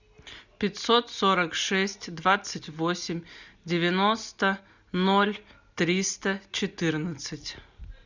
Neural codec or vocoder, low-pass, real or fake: none; 7.2 kHz; real